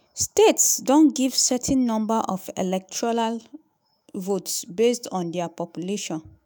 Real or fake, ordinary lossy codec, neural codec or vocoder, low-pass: fake; none; autoencoder, 48 kHz, 128 numbers a frame, DAC-VAE, trained on Japanese speech; none